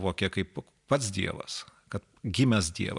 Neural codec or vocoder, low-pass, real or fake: vocoder, 44.1 kHz, 128 mel bands every 256 samples, BigVGAN v2; 10.8 kHz; fake